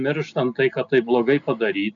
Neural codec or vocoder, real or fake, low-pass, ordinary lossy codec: none; real; 7.2 kHz; MP3, 96 kbps